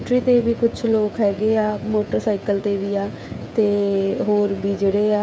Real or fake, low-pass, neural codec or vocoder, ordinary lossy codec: fake; none; codec, 16 kHz, 16 kbps, FreqCodec, smaller model; none